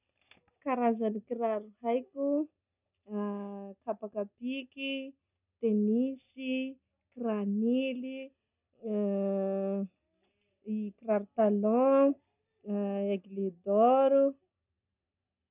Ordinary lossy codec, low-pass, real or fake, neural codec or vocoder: none; 3.6 kHz; real; none